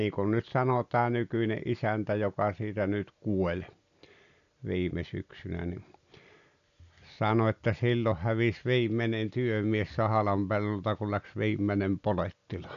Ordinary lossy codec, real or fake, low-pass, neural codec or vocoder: none; real; 7.2 kHz; none